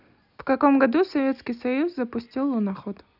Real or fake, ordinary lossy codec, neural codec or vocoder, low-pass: real; none; none; 5.4 kHz